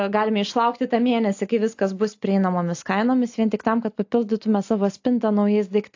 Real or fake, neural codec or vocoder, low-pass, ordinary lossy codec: real; none; 7.2 kHz; AAC, 48 kbps